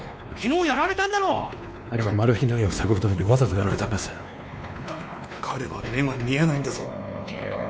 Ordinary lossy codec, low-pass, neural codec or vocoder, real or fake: none; none; codec, 16 kHz, 2 kbps, X-Codec, WavLM features, trained on Multilingual LibriSpeech; fake